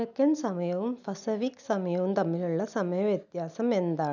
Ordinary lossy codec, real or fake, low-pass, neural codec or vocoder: none; real; 7.2 kHz; none